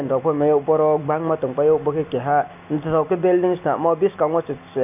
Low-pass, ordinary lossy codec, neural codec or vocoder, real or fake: 3.6 kHz; none; none; real